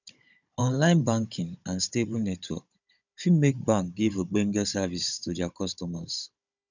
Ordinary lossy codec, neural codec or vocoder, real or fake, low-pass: none; codec, 16 kHz, 16 kbps, FunCodec, trained on Chinese and English, 50 frames a second; fake; 7.2 kHz